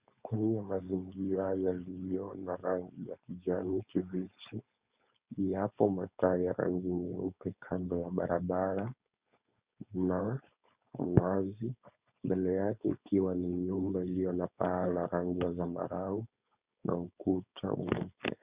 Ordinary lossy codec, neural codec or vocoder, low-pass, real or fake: Opus, 64 kbps; codec, 16 kHz, 4.8 kbps, FACodec; 3.6 kHz; fake